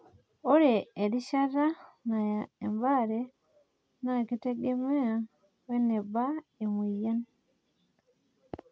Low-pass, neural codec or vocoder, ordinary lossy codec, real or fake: none; none; none; real